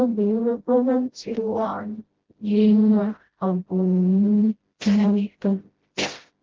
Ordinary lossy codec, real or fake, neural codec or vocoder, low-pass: Opus, 16 kbps; fake; codec, 16 kHz, 0.5 kbps, FreqCodec, smaller model; 7.2 kHz